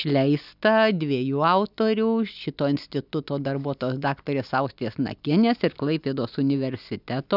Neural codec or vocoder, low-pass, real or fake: none; 5.4 kHz; real